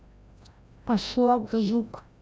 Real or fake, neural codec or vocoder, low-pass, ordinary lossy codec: fake; codec, 16 kHz, 0.5 kbps, FreqCodec, larger model; none; none